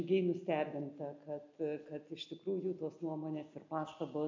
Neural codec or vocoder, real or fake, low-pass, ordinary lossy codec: none; real; 7.2 kHz; MP3, 64 kbps